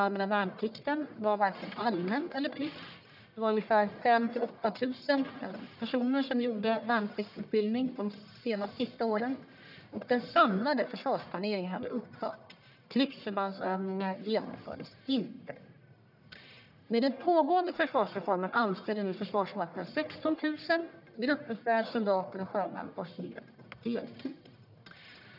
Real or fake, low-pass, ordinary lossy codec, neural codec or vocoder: fake; 5.4 kHz; none; codec, 44.1 kHz, 1.7 kbps, Pupu-Codec